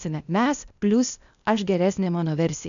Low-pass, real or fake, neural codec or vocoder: 7.2 kHz; fake; codec, 16 kHz, 0.8 kbps, ZipCodec